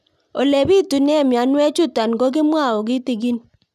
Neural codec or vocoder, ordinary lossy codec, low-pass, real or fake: none; none; 14.4 kHz; real